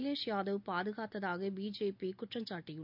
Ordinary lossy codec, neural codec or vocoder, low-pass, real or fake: none; none; 5.4 kHz; real